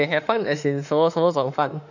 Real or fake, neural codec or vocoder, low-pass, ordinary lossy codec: fake; autoencoder, 48 kHz, 32 numbers a frame, DAC-VAE, trained on Japanese speech; 7.2 kHz; none